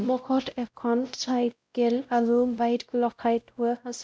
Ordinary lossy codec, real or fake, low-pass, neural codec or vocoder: none; fake; none; codec, 16 kHz, 0.5 kbps, X-Codec, WavLM features, trained on Multilingual LibriSpeech